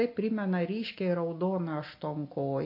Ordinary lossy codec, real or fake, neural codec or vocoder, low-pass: MP3, 32 kbps; real; none; 5.4 kHz